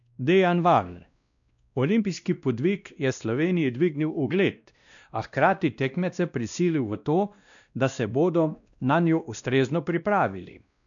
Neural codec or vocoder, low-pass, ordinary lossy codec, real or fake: codec, 16 kHz, 1 kbps, X-Codec, WavLM features, trained on Multilingual LibriSpeech; 7.2 kHz; none; fake